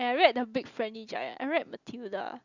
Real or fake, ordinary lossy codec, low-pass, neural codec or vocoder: real; Opus, 64 kbps; 7.2 kHz; none